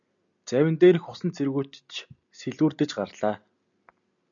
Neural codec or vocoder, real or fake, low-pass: none; real; 7.2 kHz